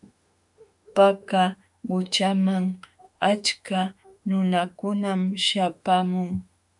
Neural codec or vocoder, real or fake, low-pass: autoencoder, 48 kHz, 32 numbers a frame, DAC-VAE, trained on Japanese speech; fake; 10.8 kHz